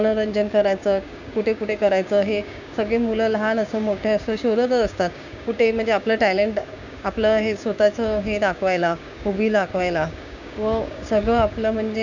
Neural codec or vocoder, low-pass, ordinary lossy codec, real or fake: codec, 16 kHz, 6 kbps, DAC; 7.2 kHz; none; fake